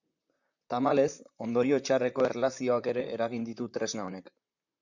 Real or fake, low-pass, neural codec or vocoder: fake; 7.2 kHz; vocoder, 44.1 kHz, 128 mel bands, Pupu-Vocoder